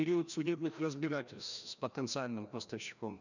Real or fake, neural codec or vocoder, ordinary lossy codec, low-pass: fake; codec, 16 kHz, 1 kbps, FreqCodec, larger model; none; 7.2 kHz